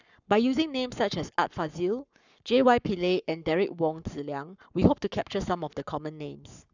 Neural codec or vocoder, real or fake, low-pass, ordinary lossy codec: codec, 44.1 kHz, 7.8 kbps, Pupu-Codec; fake; 7.2 kHz; none